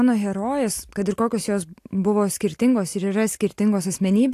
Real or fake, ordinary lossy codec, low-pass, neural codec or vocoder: real; AAC, 64 kbps; 14.4 kHz; none